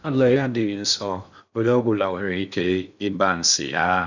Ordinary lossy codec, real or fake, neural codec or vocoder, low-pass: none; fake; codec, 16 kHz in and 24 kHz out, 0.6 kbps, FocalCodec, streaming, 2048 codes; 7.2 kHz